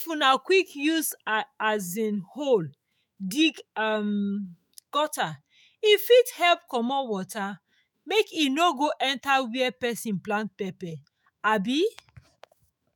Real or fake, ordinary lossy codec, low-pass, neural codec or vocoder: fake; none; none; autoencoder, 48 kHz, 128 numbers a frame, DAC-VAE, trained on Japanese speech